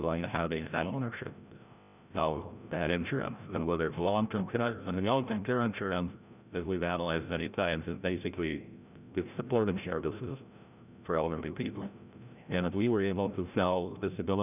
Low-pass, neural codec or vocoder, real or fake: 3.6 kHz; codec, 16 kHz, 0.5 kbps, FreqCodec, larger model; fake